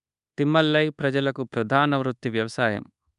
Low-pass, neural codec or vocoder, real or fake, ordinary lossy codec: 10.8 kHz; codec, 24 kHz, 1.2 kbps, DualCodec; fake; MP3, 96 kbps